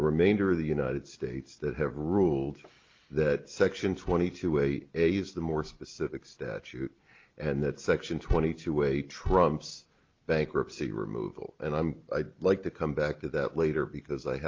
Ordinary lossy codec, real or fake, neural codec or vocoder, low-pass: Opus, 24 kbps; real; none; 7.2 kHz